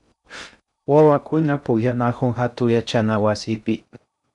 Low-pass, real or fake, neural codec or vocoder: 10.8 kHz; fake; codec, 16 kHz in and 24 kHz out, 0.6 kbps, FocalCodec, streaming, 2048 codes